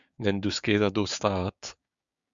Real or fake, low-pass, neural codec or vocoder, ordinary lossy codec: fake; 7.2 kHz; codec, 16 kHz, 6 kbps, DAC; Opus, 64 kbps